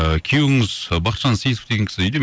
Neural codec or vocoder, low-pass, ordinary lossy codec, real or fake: none; none; none; real